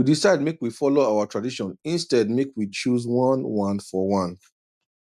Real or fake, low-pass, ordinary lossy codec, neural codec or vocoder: real; 14.4 kHz; none; none